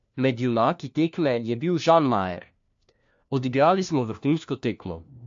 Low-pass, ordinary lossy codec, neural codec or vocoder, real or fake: 7.2 kHz; AAC, 64 kbps; codec, 16 kHz, 1 kbps, FunCodec, trained on LibriTTS, 50 frames a second; fake